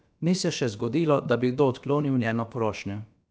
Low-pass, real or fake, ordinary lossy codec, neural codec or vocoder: none; fake; none; codec, 16 kHz, about 1 kbps, DyCAST, with the encoder's durations